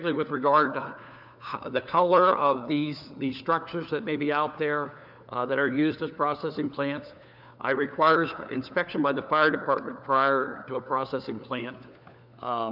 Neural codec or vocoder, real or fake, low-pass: codec, 16 kHz, 4 kbps, FunCodec, trained on LibriTTS, 50 frames a second; fake; 5.4 kHz